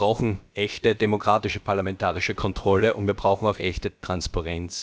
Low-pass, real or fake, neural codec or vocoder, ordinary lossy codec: none; fake; codec, 16 kHz, about 1 kbps, DyCAST, with the encoder's durations; none